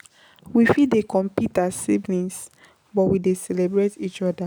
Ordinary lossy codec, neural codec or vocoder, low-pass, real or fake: none; none; none; real